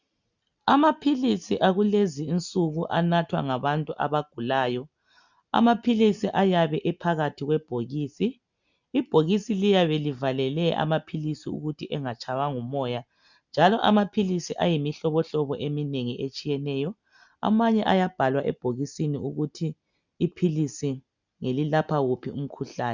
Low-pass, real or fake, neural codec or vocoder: 7.2 kHz; real; none